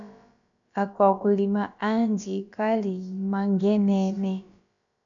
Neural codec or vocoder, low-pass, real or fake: codec, 16 kHz, about 1 kbps, DyCAST, with the encoder's durations; 7.2 kHz; fake